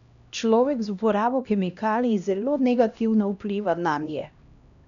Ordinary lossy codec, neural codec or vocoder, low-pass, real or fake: none; codec, 16 kHz, 1 kbps, X-Codec, HuBERT features, trained on LibriSpeech; 7.2 kHz; fake